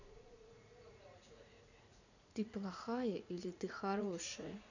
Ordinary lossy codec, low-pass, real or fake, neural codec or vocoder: none; 7.2 kHz; fake; vocoder, 44.1 kHz, 80 mel bands, Vocos